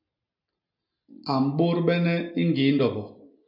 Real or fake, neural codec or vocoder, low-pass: real; none; 5.4 kHz